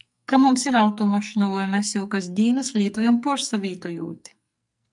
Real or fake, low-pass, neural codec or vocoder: fake; 10.8 kHz; codec, 44.1 kHz, 2.6 kbps, SNAC